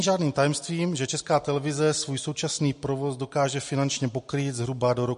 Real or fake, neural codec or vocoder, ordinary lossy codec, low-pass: real; none; MP3, 48 kbps; 10.8 kHz